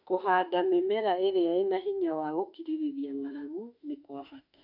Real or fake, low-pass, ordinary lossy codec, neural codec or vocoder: fake; 5.4 kHz; none; autoencoder, 48 kHz, 32 numbers a frame, DAC-VAE, trained on Japanese speech